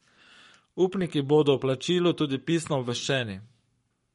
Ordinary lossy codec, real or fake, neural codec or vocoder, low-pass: MP3, 48 kbps; fake; codec, 44.1 kHz, 7.8 kbps, Pupu-Codec; 19.8 kHz